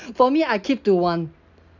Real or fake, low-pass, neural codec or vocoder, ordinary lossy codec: real; 7.2 kHz; none; none